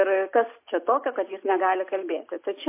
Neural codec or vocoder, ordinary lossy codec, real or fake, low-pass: none; MP3, 24 kbps; real; 3.6 kHz